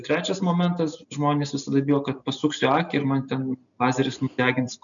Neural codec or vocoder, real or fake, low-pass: none; real; 7.2 kHz